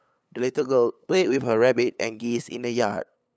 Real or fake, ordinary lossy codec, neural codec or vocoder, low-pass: fake; none; codec, 16 kHz, 8 kbps, FunCodec, trained on LibriTTS, 25 frames a second; none